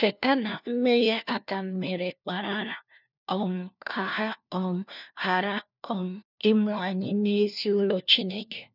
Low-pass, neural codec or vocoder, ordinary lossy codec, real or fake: 5.4 kHz; codec, 16 kHz, 1 kbps, FunCodec, trained on LibriTTS, 50 frames a second; none; fake